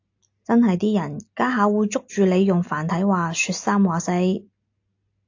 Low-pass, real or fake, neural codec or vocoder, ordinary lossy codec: 7.2 kHz; real; none; AAC, 48 kbps